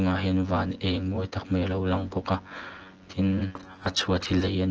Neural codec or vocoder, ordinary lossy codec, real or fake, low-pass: vocoder, 24 kHz, 100 mel bands, Vocos; Opus, 16 kbps; fake; 7.2 kHz